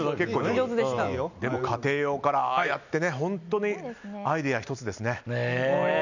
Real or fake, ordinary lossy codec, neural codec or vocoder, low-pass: real; none; none; 7.2 kHz